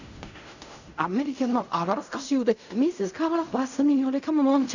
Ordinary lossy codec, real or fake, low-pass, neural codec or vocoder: none; fake; 7.2 kHz; codec, 16 kHz in and 24 kHz out, 0.4 kbps, LongCat-Audio-Codec, fine tuned four codebook decoder